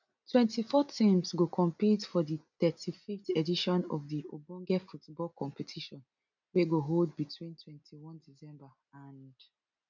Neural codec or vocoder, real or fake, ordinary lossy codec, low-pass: none; real; none; 7.2 kHz